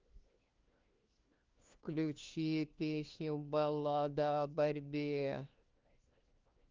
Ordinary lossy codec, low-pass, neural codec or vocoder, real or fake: Opus, 32 kbps; 7.2 kHz; codec, 16 kHz, 1 kbps, FunCodec, trained on LibriTTS, 50 frames a second; fake